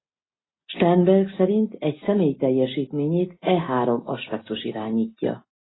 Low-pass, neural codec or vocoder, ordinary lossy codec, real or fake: 7.2 kHz; none; AAC, 16 kbps; real